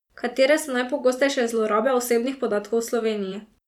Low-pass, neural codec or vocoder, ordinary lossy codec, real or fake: 19.8 kHz; vocoder, 44.1 kHz, 128 mel bands every 512 samples, BigVGAN v2; none; fake